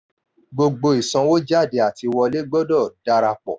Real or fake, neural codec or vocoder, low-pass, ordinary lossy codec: real; none; none; none